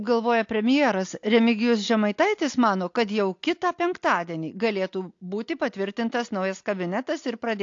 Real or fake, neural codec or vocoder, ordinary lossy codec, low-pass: real; none; AAC, 48 kbps; 7.2 kHz